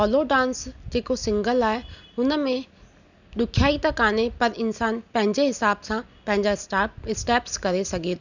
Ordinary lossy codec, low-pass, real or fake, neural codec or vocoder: none; 7.2 kHz; real; none